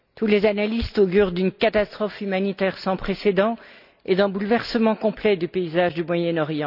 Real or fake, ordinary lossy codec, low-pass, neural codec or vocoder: real; none; 5.4 kHz; none